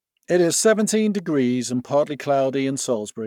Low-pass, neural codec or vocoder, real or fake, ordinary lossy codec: 19.8 kHz; codec, 44.1 kHz, 7.8 kbps, Pupu-Codec; fake; none